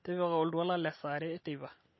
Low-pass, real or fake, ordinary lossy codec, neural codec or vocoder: 7.2 kHz; real; MP3, 24 kbps; none